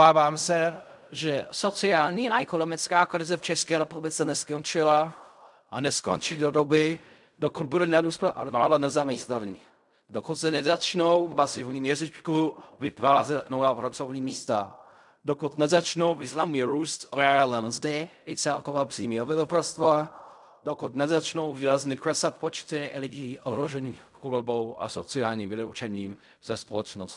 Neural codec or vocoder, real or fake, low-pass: codec, 16 kHz in and 24 kHz out, 0.4 kbps, LongCat-Audio-Codec, fine tuned four codebook decoder; fake; 10.8 kHz